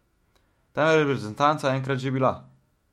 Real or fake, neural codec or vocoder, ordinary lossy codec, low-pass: fake; autoencoder, 48 kHz, 128 numbers a frame, DAC-VAE, trained on Japanese speech; MP3, 64 kbps; 19.8 kHz